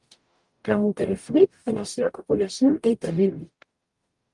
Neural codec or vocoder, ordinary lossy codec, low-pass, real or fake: codec, 44.1 kHz, 0.9 kbps, DAC; Opus, 32 kbps; 10.8 kHz; fake